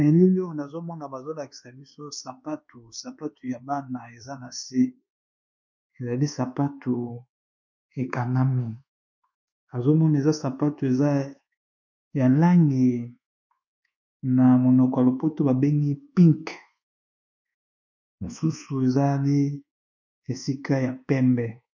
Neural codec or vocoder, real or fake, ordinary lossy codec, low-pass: codec, 24 kHz, 1.2 kbps, DualCodec; fake; MP3, 64 kbps; 7.2 kHz